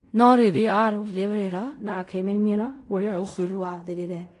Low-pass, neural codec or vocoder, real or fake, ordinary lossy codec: 10.8 kHz; codec, 16 kHz in and 24 kHz out, 0.4 kbps, LongCat-Audio-Codec, fine tuned four codebook decoder; fake; AAC, 48 kbps